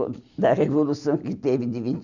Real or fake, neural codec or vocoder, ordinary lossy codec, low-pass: real; none; none; 7.2 kHz